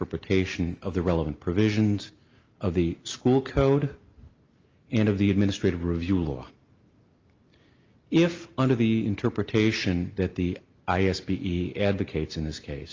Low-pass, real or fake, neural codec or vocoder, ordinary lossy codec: 7.2 kHz; real; none; Opus, 24 kbps